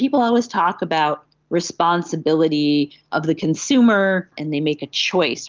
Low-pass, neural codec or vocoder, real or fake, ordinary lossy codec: 7.2 kHz; none; real; Opus, 32 kbps